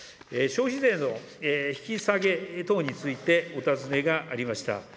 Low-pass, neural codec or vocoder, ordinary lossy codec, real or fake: none; none; none; real